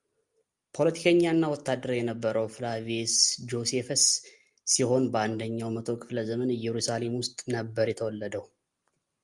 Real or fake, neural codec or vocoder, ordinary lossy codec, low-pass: real; none; Opus, 32 kbps; 10.8 kHz